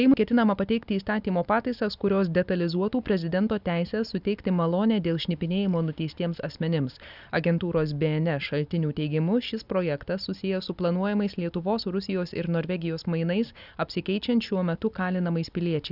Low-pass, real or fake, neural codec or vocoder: 5.4 kHz; real; none